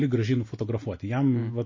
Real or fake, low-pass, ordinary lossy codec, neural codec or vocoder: real; 7.2 kHz; MP3, 32 kbps; none